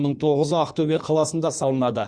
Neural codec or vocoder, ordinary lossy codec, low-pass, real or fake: codec, 16 kHz in and 24 kHz out, 1.1 kbps, FireRedTTS-2 codec; Opus, 64 kbps; 9.9 kHz; fake